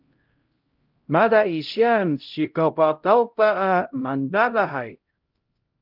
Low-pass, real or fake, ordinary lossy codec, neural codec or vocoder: 5.4 kHz; fake; Opus, 32 kbps; codec, 16 kHz, 0.5 kbps, X-Codec, HuBERT features, trained on LibriSpeech